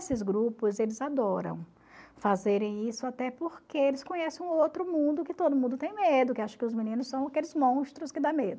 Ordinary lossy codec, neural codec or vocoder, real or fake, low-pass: none; none; real; none